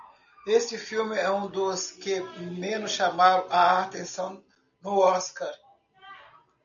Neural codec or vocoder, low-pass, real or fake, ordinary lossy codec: none; 7.2 kHz; real; MP3, 96 kbps